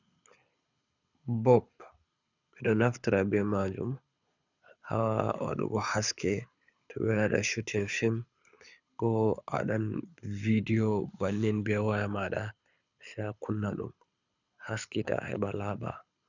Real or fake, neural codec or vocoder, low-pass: fake; codec, 24 kHz, 6 kbps, HILCodec; 7.2 kHz